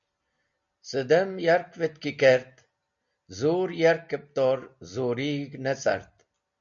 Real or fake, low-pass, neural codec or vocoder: real; 7.2 kHz; none